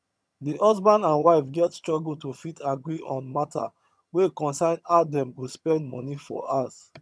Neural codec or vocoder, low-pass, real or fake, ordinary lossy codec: vocoder, 22.05 kHz, 80 mel bands, HiFi-GAN; none; fake; none